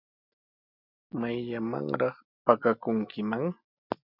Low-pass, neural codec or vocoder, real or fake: 5.4 kHz; none; real